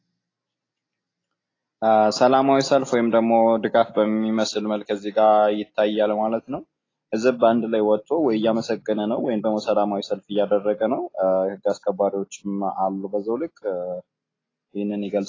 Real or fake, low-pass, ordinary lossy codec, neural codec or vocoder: real; 7.2 kHz; AAC, 32 kbps; none